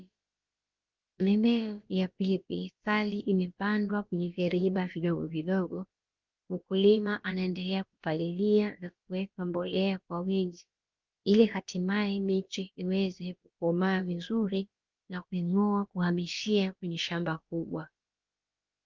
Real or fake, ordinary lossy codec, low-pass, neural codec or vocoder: fake; Opus, 32 kbps; 7.2 kHz; codec, 16 kHz, about 1 kbps, DyCAST, with the encoder's durations